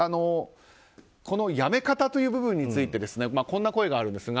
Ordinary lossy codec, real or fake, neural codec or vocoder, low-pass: none; real; none; none